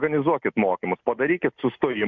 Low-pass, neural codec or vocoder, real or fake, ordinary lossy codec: 7.2 kHz; none; real; MP3, 64 kbps